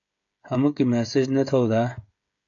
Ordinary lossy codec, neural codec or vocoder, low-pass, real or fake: AAC, 48 kbps; codec, 16 kHz, 16 kbps, FreqCodec, smaller model; 7.2 kHz; fake